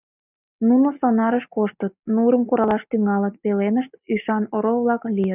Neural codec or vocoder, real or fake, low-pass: none; real; 3.6 kHz